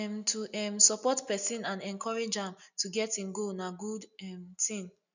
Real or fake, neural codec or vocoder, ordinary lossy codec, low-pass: real; none; none; 7.2 kHz